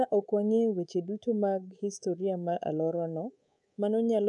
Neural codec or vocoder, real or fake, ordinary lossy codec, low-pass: codec, 24 kHz, 3.1 kbps, DualCodec; fake; none; 10.8 kHz